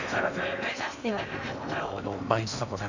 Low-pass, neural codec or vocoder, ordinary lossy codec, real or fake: 7.2 kHz; codec, 16 kHz in and 24 kHz out, 0.8 kbps, FocalCodec, streaming, 65536 codes; none; fake